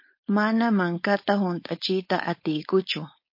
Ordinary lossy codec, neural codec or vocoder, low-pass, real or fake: MP3, 32 kbps; codec, 16 kHz, 4.8 kbps, FACodec; 5.4 kHz; fake